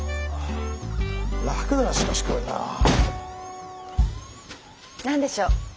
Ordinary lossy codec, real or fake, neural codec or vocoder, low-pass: none; real; none; none